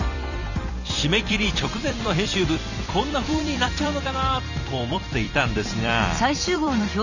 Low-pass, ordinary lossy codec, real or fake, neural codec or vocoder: 7.2 kHz; none; fake; vocoder, 44.1 kHz, 128 mel bands every 256 samples, BigVGAN v2